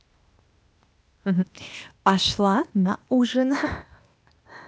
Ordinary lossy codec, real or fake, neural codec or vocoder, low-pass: none; fake; codec, 16 kHz, 0.8 kbps, ZipCodec; none